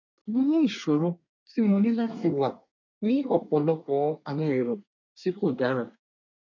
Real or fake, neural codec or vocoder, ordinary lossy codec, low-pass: fake; codec, 24 kHz, 1 kbps, SNAC; none; 7.2 kHz